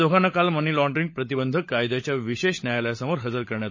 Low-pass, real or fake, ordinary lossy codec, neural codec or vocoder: 7.2 kHz; fake; MP3, 32 kbps; codec, 16 kHz, 16 kbps, FunCodec, trained on LibriTTS, 50 frames a second